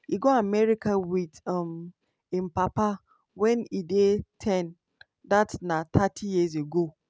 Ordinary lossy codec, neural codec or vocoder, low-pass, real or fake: none; none; none; real